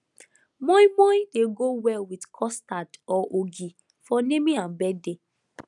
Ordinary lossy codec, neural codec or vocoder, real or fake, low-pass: none; none; real; 10.8 kHz